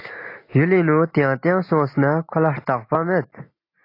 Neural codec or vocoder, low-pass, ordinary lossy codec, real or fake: none; 5.4 kHz; AAC, 32 kbps; real